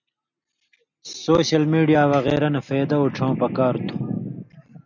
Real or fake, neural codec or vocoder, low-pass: real; none; 7.2 kHz